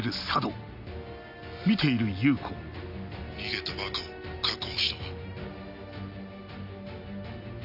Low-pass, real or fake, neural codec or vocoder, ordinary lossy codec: 5.4 kHz; real; none; AAC, 48 kbps